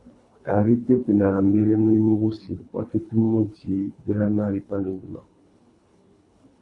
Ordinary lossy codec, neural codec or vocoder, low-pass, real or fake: AAC, 48 kbps; codec, 24 kHz, 3 kbps, HILCodec; 10.8 kHz; fake